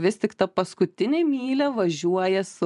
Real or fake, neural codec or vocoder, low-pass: real; none; 10.8 kHz